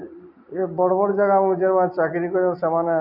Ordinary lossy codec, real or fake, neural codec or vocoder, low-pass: none; real; none; 5.4 kHz